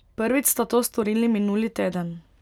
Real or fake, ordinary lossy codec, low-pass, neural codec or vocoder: real; none; 19.8 kHz; none